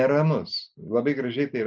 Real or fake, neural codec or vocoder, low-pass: real; none; 7.2 kHz